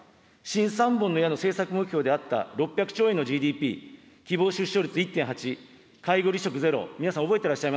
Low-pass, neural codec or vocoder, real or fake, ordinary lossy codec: none; none; real; none